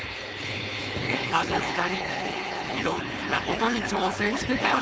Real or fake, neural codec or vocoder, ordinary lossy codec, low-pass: fake; codec, 16 kHz, 4.8 kbps, FACodec; none; none